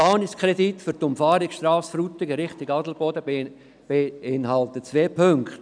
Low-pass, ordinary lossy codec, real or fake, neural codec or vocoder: 9.9 kHz; none; real; none